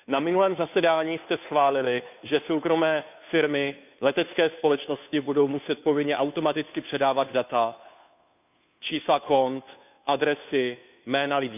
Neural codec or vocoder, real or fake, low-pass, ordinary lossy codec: codec, 16 kHz, 2 kbps, FunCodec, trained on Chinese and English, 25 frames a second; fake; 3.6 kHz; none